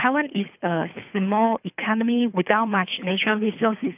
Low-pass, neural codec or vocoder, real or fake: 3.6 kHz; codec, 24 kHz, 3 kbps, HILCodec; fake